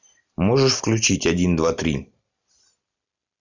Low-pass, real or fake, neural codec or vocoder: 7.2 kHz; real; none